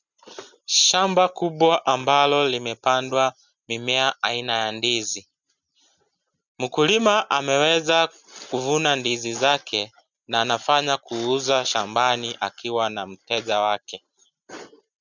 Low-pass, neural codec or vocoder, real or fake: 7.2 kHz; none; real